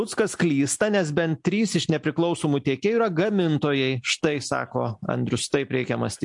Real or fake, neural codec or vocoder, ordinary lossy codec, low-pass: real; none; MP3, 64 kbps; 10.8 kHz